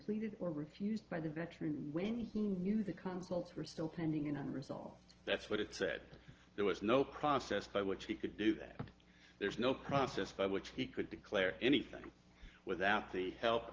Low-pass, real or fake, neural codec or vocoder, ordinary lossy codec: 7.2 kHz; real; none; Opus, 16 kbps